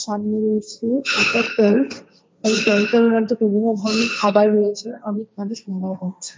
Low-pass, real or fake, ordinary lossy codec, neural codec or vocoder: none; fake; none; codec, 16 kHz, 1.1 kbps, Voila-Tokenizer